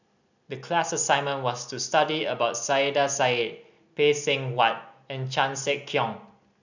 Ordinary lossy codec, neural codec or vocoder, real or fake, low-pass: none; none; real; 7.2 kHz